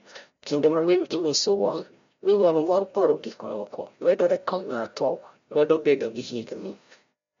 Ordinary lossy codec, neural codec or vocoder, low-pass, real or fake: MP3, 48 kbps; codec, 16 kHz, 0.5 kbps, FreqCodec, larger model; 7.2 kHz; fake